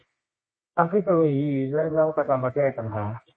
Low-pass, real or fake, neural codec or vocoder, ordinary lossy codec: 9.9 kHz; fake; codec, 24 kHz, 0.9 kbps, WavTokenizer, medium music audio release; MP3, 32 kbps